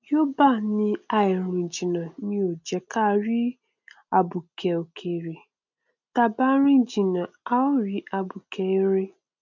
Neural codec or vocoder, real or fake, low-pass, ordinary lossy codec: none; real; 7.2 kHz; none